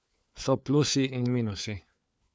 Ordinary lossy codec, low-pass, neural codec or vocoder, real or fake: none; none; codec, 16 kHz, 4 kbps, FunCodec, trained on LibriTTS, 50 frames a second; fake